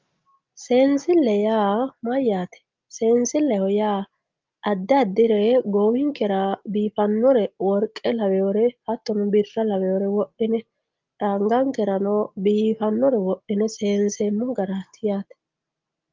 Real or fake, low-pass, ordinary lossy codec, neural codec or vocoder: real; 7.2 kHz; Opus, 32 kbps; none